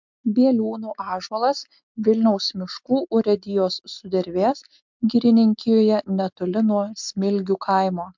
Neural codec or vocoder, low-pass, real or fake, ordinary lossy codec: none; 7.2 kHz; real; MP3, 64 kbps